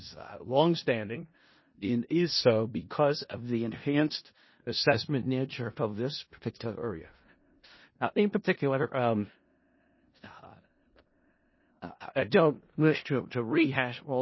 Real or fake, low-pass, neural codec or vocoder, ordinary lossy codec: fake; 7.2 kHz; codec, 16 kHz in and 24 kHz out, 0.4 kbps, LongCat-Audio-Codec, four codebook decoder; MP3, 24 kbps